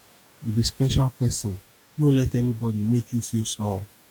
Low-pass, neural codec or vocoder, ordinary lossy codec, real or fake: 19.8 kHz; codec, 44.1 kHz, 2.6 kbps, DAC; none; fake